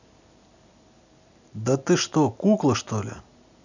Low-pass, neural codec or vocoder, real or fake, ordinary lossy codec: 7.2 kHz; none; real; none